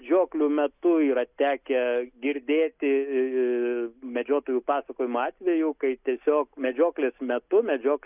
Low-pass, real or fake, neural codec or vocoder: 3.6 kHz; real; none